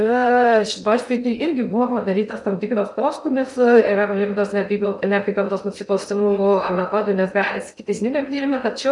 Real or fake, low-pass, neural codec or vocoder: fake; 10.8 kHz; codec, 16 kHz in and 24 kHz out, 0.6 kbps, FocalCodec, streaming, 2048 codes